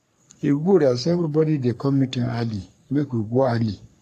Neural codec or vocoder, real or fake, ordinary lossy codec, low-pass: codec, 44.1 kHz, 3.4 kbps, Pupu-Codec; fake; AAC, 64 kbps; 14.4 kHz